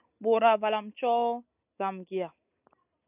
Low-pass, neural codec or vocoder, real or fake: 3.6 kHz; none; real